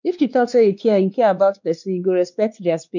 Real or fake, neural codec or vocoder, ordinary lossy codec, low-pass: fake; codec, 16 kHz, 2 kbps, X-Codec, WavLM features, trained on Multilingual LibriSpeech; none; 7.2 kHz